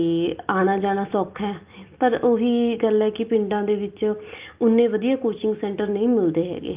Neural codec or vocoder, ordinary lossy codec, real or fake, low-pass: none; Opus, 32 kbps; real; 3.6 kHz